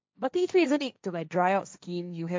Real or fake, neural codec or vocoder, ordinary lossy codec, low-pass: fake; codec, 16 kHz, 1.1 kbps, Voila-Tokenizer; none; none